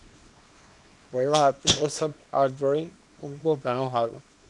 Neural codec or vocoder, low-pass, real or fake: codec, 24 kHz, 0.9 kbps, WavTokenizer, small release; 10.8 kHz; fake